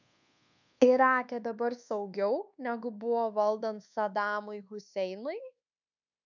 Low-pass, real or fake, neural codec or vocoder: 7.2 kHz; fake; codec, 24 kHz, 1.2 kbps, DualCodec